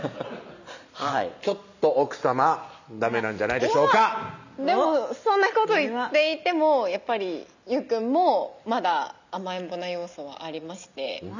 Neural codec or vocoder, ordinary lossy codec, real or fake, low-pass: none; none; real; 7.2 kHz